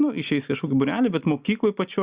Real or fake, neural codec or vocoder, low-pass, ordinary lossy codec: real; none; 3.6 kHz; Opus, 64 kbps